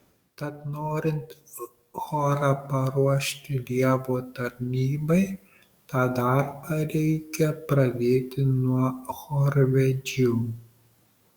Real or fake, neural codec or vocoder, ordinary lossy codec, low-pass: fake; codec, 44.1 kHz, 7.8 kbps, DAC; Opus, 64 kbps; 19.8 kHz